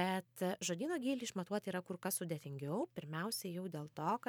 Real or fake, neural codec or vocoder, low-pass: fake; vocoder, 44.1 kHz, 128 mel bands every 512 samples, BigVGAN v2; 19.8 kHz